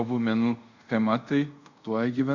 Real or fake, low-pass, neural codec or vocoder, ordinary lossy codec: fake; 7.2 kHz; codec, 24 kHz, 0.5 kbps, DualCodec; Opus, 64 kbps